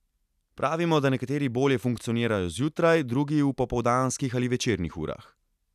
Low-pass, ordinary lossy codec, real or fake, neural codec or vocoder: 14.4 kHz; none; real; none